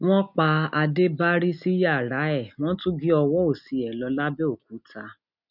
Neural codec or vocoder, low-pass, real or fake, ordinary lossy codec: none; 5.4 kHz; real; none